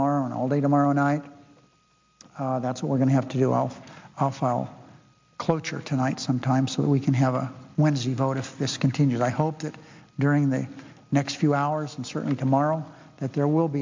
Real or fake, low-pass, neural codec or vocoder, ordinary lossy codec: real; 7.2 kHz; none; MP3, 64 kbps